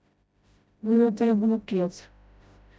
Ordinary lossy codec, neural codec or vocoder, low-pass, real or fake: none; codec, 16 kHz, 0.5 kbps, FreqCodec, smaller model; none; fake